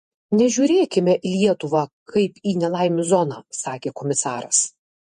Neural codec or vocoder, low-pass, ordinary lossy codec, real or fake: vocoder, 48 kHz, 128 mel bands, Vocos; 14.4 kHz; MP3, 48 kbps; fake